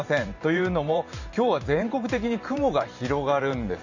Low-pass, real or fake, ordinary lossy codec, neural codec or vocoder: 7.2 kHz; fake; none; vocoder, 44.1 kHz, 128 mel bands every 512 samples, BigVGAN v2